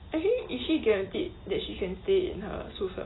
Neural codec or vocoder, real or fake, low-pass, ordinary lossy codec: none; real; 7.2 kHz; AAC, 16 kbps